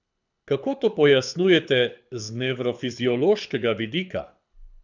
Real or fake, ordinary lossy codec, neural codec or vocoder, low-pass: fake; none; codec, 24 kHz, 6 kbps, HILCodec; 7.2 kHz